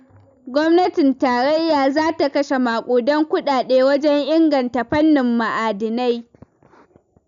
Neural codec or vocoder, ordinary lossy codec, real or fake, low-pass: none; none; real; 7.2 kHz